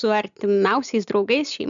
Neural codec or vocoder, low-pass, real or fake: none; 7.2 kHz; real